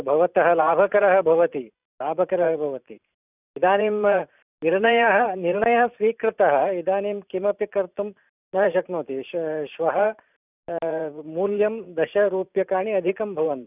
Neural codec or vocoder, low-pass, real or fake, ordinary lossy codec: vocoder, 44.1 kHz, 128 mel bands every 512 samples, BigVGAN v2; 3.6 kHz; fake; none